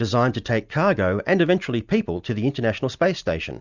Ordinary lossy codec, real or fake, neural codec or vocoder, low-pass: Opus, 64 kbps; real; none; 7.2 kHz